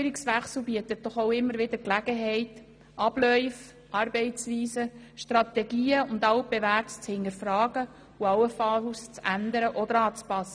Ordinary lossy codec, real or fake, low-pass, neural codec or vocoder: none; real; 9.9 kHz; none